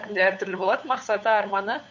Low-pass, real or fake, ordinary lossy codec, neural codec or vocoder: 7.2 kHz; fake; none; codec, 16 kHz, 8 kbps, FunCodec, trained on LibriTTS, 25 frames a second